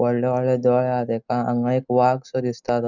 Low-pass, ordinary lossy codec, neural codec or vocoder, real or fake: 7.2 kHz; none; none; real